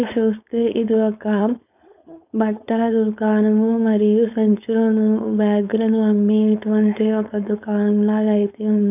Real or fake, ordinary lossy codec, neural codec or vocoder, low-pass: fake; none; codec, 16 kHz, 4.8 kbps, FACodec; 3.6 kHz